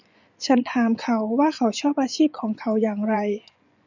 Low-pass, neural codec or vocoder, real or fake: 7.2 kHz; vocoder, 24 kHz, 100 mel bands, Vocos; fake